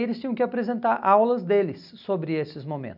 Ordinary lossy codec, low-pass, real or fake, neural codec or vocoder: none; 5.4 kHz; real; none